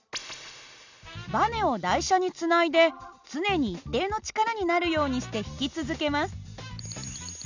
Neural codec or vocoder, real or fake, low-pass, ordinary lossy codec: none; real; 7.2 kHz; none